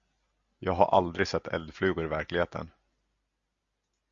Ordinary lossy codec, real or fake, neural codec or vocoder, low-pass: Opus, 64 kbps; real; none; 7.2 kHz